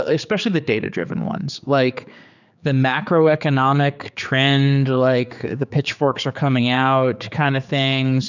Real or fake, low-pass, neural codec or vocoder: fake; 7.2 kHz; codec, 16 kHz, 4 kbps, X-Codec, HuBERT features, trained on general audio